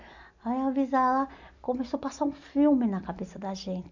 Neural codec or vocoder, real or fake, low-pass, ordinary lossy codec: none; real; 7.2 kHz; none